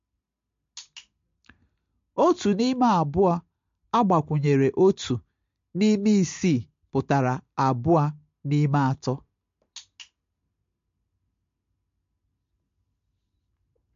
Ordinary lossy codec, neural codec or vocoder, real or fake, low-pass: MP3, 48 kbps; none; real; 7.2 kHz